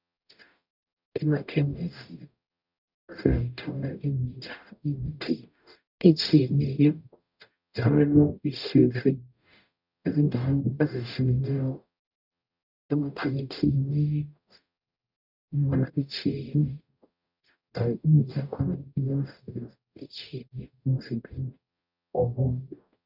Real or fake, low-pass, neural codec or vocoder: fake; 5.4 kHz; codec, 44.1 kHz, 0.9 kbps, DAC